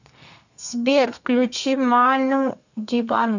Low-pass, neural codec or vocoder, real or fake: 7.2 kHz; codec, 32 kHz, 1.9 kbps, SNAC; fake